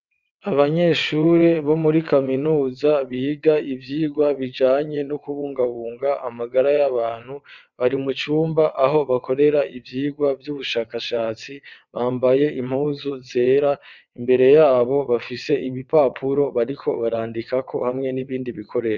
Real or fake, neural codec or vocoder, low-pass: fake; vocoder, 22.05 kHz, 80 mel bands, WaveNeXt; 7.2 kHz